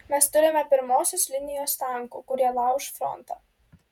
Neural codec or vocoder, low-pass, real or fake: vocoder, 48 kHz, 128 mel bands, Vocos; 19.8 kHz; fake